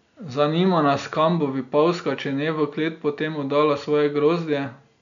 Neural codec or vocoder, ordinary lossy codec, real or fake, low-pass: none; none; real; 7.2 kHz